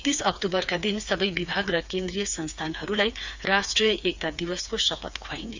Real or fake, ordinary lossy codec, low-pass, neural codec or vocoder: fake; Opus, 64 kbps; 7.2 kHz; codec, 16 kHz, 4 kbps, FreqCodec, smaller model